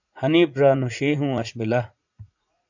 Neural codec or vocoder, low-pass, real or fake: vocoder, 44.1 kHz, 80 mel bands, Vocos; 7.2 kHz; fake